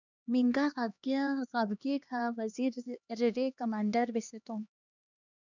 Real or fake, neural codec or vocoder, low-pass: fake; codec, 16 kHz, 2 kbps, X-Codec, HuBERT features, trained on LibriSpeech; 7.2 kHz